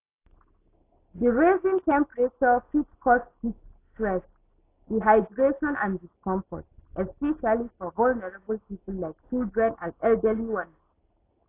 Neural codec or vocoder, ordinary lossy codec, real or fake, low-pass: none; AAC, 24 kbps; real; 3.6 kHz